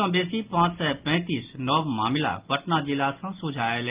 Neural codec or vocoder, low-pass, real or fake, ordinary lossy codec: none; 3.6 kHz; real; Opus, 32 kbps